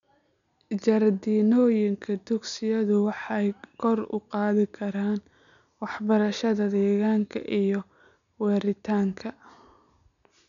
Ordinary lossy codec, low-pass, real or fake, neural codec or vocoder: none; 7.2 kHz; real; none